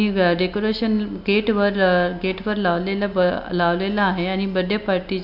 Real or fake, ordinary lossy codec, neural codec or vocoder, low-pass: real; none; none; 5.4 kHz